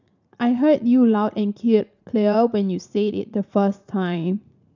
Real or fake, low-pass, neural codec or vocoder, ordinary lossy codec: fake; 7.2 kHz; vocoder, 44.1 kHz, 80 mel bands, Vocos; none